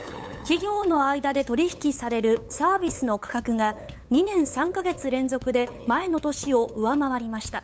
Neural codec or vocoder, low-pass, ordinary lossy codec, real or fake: codec, 16 kHz, 8 kbps, FunCodec, trained on LibriTTS, 25 frames a second; none; none; fake